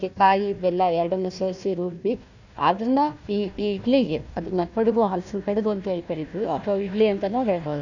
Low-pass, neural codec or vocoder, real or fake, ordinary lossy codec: 7.2 kHz; codec, 16 kHz, 1 kbps, FunCodec, trained on Chinese and English, 50 frames a second; fake; none